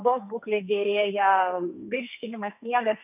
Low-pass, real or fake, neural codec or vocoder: 3.6 kHz; fake; codec, 44.1 kHz, 2.6 kbps, SNAC